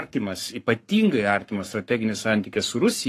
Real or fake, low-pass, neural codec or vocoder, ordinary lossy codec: fake; 14.4 kHz; codec, 44.1 kHz, 7.8 kbps, Pupu-Codec; AAC, 48 kbps